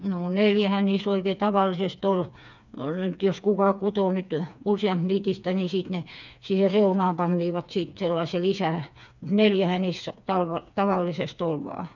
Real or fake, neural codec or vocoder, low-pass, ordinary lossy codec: fake; codec, 16 kHz, 4 kbps, FreqCodec, smaller model; 7.2 kHz; none